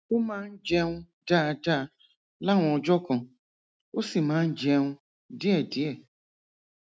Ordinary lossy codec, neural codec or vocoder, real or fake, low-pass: none; none; real; none